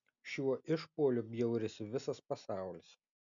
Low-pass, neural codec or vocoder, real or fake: 7.2 kHz; none; real